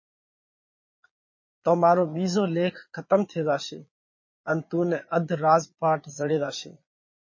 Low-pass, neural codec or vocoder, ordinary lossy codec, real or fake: 7.2 kHz; vocoder, 22.05 kHz, 80 mel bands, Vocos; MP3, 32 kbps; fake